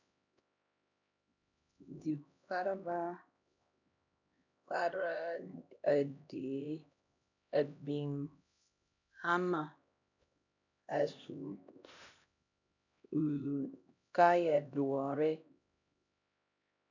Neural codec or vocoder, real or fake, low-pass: codec, 16 kHz, 1 kbps, X-Codec, HuBERT features, trained on LibriSpeech; fake; 7.2 kHz